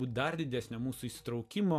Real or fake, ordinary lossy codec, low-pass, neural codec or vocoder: real; MP3, 96 kbps; 14.4 kHz; none